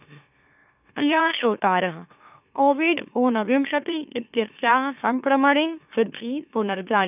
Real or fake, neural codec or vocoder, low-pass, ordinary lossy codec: fake; autoencoder, 44.1 kHz, a latent of 192 numbers a frame, MeloTTS; 3.6 kHz; none